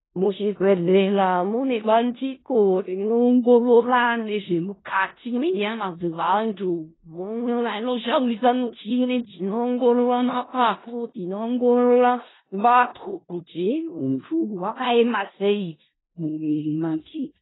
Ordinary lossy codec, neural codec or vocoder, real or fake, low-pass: AAC, 16 kbps; codec, 16 kHz in and 24 kHz out, 0.4 kbps, LongCat-Audio-Codec, four codebook decoder; fake; 7.2 kHz